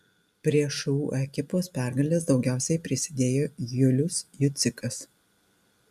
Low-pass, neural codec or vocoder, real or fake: 14.4 kHz; none; real